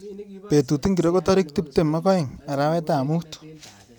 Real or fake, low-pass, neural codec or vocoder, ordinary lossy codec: real; none; none; none